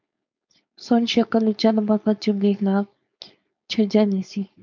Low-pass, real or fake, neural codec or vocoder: 7.2 kHz; fake; codec, 16 kHz, 4.8 kbps, FACodec